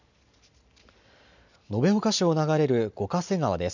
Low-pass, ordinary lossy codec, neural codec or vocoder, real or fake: 7.2 kHz; none; none; real